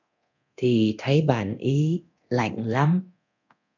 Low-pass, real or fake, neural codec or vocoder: 7.2 kHz; fake; codec, 24 kHz, 0.9 kbps, DualCodec